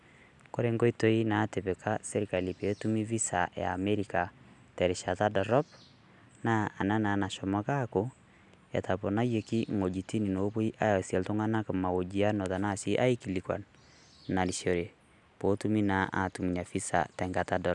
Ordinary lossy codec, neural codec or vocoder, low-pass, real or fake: none; none; 10.8 kHz; real